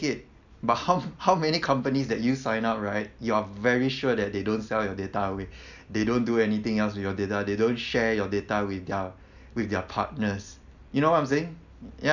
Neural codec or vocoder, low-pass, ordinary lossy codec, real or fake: none; 7.2 kHz; none; real